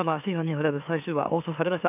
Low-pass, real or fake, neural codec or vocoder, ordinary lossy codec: 3.6 kHz; fake; autoencoder, 44.1 kHz, a latent of 192 numbers a frame, MeloTTS; none